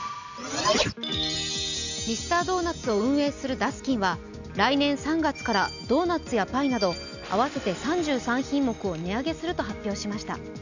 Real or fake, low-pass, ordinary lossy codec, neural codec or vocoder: real; 7.2 kHz; none; none